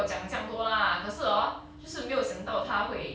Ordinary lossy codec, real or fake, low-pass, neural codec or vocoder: none; real; none; none